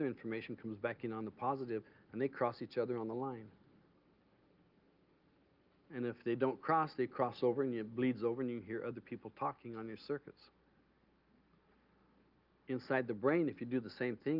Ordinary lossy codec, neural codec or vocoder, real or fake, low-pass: Opus, 24 kbps; none; real; 5.4 kHz